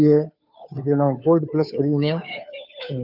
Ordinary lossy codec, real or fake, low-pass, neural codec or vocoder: none; fake; 5.4 kHz; codec, 16 kHz, 2 kbps, FunCodec, trained on Chinese and English, 25 frames a second